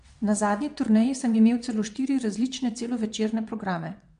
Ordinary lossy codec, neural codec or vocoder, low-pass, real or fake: MP3, 64 kbps; vocoder, 22.05 kHz, 80 mel bands, WaveNeXt; 9.9 kHz; fake